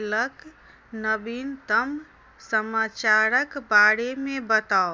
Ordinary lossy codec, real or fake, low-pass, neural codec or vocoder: none; real; none; none